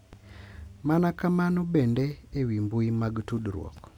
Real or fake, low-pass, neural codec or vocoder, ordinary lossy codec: real; 19.8 kHz; none; none